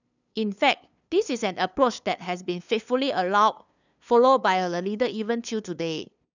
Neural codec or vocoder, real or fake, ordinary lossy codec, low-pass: codec, 16 kHz, 2 kbps, FunCodec, trained on LibriTTS, 25 frames a second; fake; none; 7.2 kHz